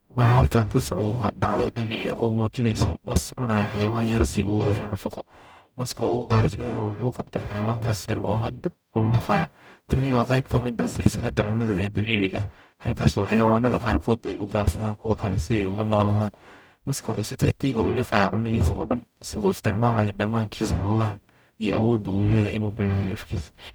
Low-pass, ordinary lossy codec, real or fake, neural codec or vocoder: none; none; fake; codec, 44.1 kHz, 0.9 kbps, DAC